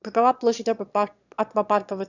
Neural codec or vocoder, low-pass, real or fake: autoencoder, 22.05 kHz, a latent of 192 numbers a frame, VITS, trained on one speaker; 7.2 kHz; fake